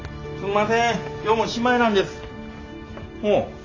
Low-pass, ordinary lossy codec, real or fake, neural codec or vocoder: 7.2 kHz; AAC, 32 kbps; real; none